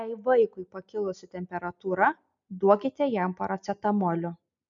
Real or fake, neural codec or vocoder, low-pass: real; none; 7.2 kHz